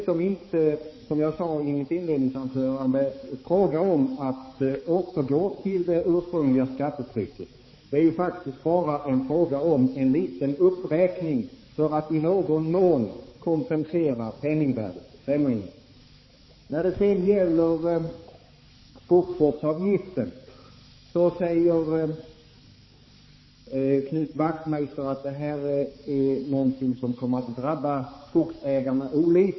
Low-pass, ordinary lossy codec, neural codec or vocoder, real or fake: 7.2 kHz; MP3, 24 kbps; codec, 16 kHz, 4 kbps, X-Codec, HuBERT features, trained on balanced general audio; fake